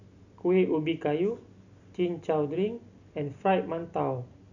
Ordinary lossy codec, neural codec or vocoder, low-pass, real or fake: none; none; 7.2 kHz; real